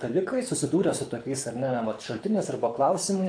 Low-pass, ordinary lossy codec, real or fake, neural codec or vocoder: 9.9 kHz; AAC, 48 kbps; fake; codec, 24 kHz, 6 kbps, HILCodec